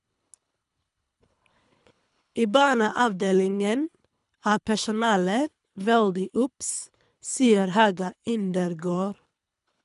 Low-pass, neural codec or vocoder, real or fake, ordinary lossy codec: 10.8 kHz; codec, 24 kHz, 3 kbps, HILCodec; fake; none